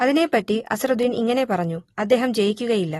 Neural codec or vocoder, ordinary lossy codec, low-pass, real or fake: none; AAC, 32 kbps; 19.8 kHz; real